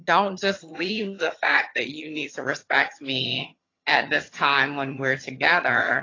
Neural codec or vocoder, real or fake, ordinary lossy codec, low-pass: vocoder, 22.05 kHz, 80 mel bands, HiFi-GAN; fake; AAC, 32 kbps; 7.2 kHz